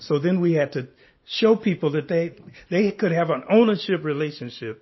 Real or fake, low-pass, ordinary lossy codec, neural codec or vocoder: real; 7.2 kHz; MP3, 24 kbps; none